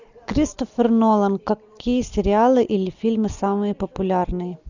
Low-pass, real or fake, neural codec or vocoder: 7.2 kHz; real; none